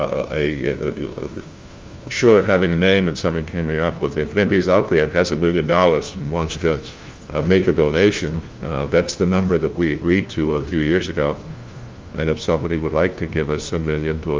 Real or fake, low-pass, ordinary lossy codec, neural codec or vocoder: fake; 7.2 kHz; Opus, 32 kbps; codec, 16 kHz, 1 kbps, FunCodec, trained on LibriTTS, 50 frames a second